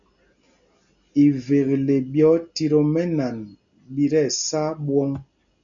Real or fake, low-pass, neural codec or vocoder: real; 7.2 kHz; none